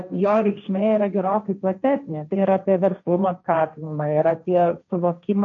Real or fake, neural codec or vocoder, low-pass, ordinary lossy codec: fake; codec, 16 kHz, 1.1 kbps, Voila-Tokenizer; 7.2 kHz; MP3, 96 kbps